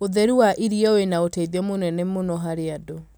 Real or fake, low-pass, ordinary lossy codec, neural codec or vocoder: real; none; none; none